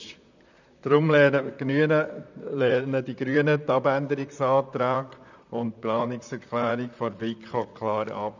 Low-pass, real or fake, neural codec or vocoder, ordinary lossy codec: 7.2 kHz; fake; vocoder, 44.1 kHz, 128 mel bands, Pupu-Vocoder; none